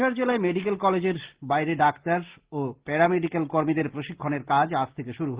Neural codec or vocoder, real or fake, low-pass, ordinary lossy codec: none; real; 3.6 kHz; Opus, 16 kbps